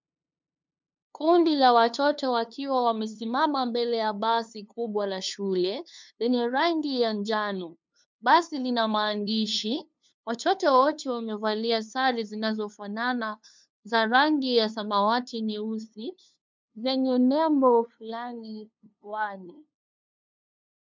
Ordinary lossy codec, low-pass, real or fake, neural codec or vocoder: MP3, 64 kbps; 7.2 kHz; fake; codec, 16 kHz, 2 kbps, FunCodec, trained on LibriTTS, 25 frames a second